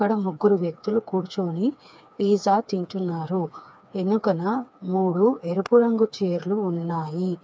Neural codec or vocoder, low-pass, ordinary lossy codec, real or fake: codec, 16 kHz, 4 kbps, FreqCodec, smaller model; none; none; fake